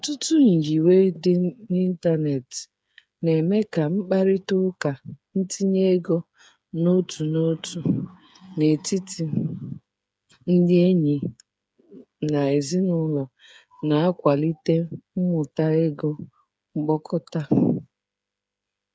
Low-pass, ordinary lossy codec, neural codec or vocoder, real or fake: none; none; codec, 16 kHz, 8 kbps, FreqCodec, smaller model; fake